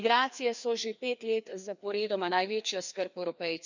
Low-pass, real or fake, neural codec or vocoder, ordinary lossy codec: 7.2 kHz; fake; codec, 16 kHz, 2 kbps, FreqCodec, larger model; none